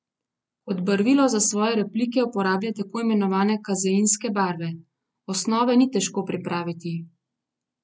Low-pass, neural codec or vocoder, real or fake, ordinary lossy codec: none; none; real; none